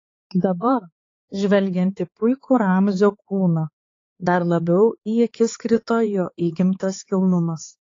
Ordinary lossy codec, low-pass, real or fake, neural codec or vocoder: AAC, 32 kbps; 7.2 kHz; fake; codec, 16 kHz, 4 kbps, X-Codec, HuBERT features, trained on balanced general audio